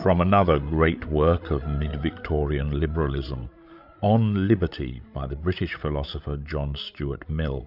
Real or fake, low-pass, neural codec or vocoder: fake; 5.4 kHz; codec, 16 kHz, 16 kbps, FreqCodec, larger model